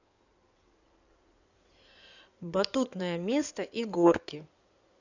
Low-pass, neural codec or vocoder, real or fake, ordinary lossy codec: 7.2 kHz; codec, 16 kHz in and 24 kHz out, 2.2 kbps, FireRedTTS-2 codec; fake; none